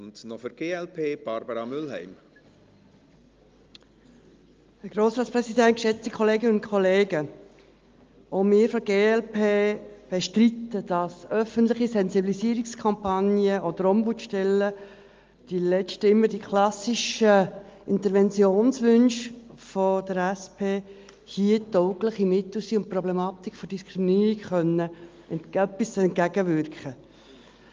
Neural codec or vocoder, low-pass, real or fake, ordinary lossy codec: none; 7.2 kHz; real; Opus, 32 kbps